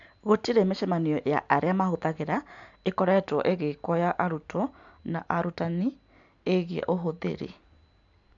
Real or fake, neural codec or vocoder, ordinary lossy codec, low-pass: real; none; none; 7.2 kHz